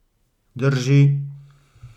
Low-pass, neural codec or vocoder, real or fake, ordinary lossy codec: 19.8 kHz; none; real; none